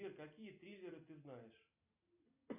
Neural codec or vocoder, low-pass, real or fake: none; 3.6 kHz; real